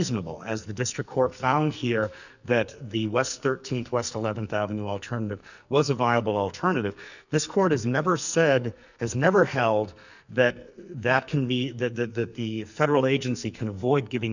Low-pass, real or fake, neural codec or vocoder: 7.2 kHz; fake; codec, 44.1 kHz, 2.6 kbps, SNAC